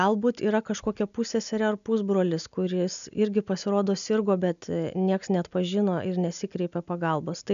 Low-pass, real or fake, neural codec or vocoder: 7.2 kHz; real; none